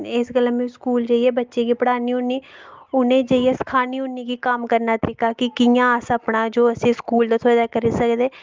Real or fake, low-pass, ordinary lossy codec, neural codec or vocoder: real; 7.2 kHz; Opus, 32 kbps; none